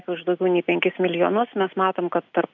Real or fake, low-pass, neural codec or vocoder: real; 7.2 kHz; none